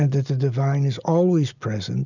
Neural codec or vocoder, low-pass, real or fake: none; 7.2 kHz; real